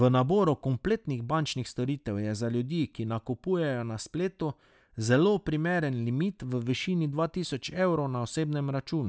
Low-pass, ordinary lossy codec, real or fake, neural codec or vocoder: none; none; real; none